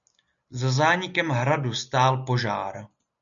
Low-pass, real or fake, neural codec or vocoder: 7.2 kHz; real; none